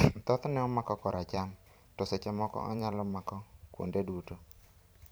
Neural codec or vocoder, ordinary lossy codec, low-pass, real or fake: vocoder, 44.1 kHz, 128 mel bands every 512 samples, BigVGAN v2; none; none; fake